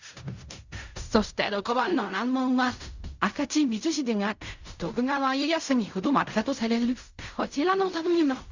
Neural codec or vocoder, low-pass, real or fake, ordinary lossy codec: codec, 16 kHz in and 24 kHz out, 0.4 kbps, LongCat-Audio-Codec, fine tuned four codebook decoder; 7.2 kHz; fake; Opus, 64 kbps